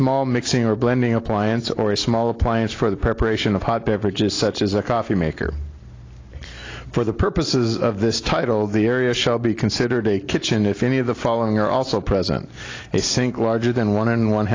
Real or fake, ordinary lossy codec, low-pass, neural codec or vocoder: real; AAC, 32 kbps; 7.2 kHz; none